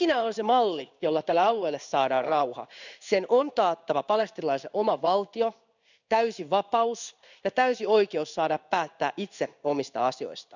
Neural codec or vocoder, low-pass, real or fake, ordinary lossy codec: codec, 16 kHz in and 24 kHz out, 1 kbps, XY-Tokenizer; 7.2 kHz; fake; none